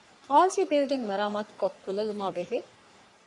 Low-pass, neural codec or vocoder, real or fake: 10.8 kHz; codec, 44.1 kHz, 3.4 kbps, Pupu-Codec; fake